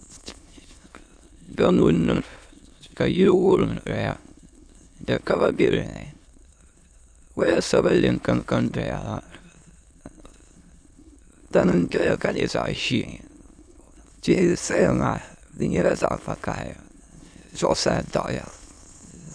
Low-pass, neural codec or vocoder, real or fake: 9.9 kHz; autoencoder, 22.05 kHz, a latent of 192 numbers a frame, VITS, trained on many speakers; fake